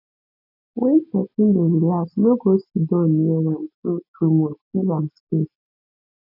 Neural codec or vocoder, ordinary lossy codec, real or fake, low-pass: none; none; real; 5.4 kHz